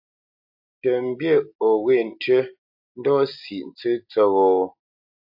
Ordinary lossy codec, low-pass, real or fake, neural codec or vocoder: AAC, 48 kbps; 5.4 kHz; real; none